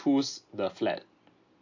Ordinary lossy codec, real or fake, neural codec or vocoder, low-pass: AAC, 48 kbps; real; none; 7.2 kHz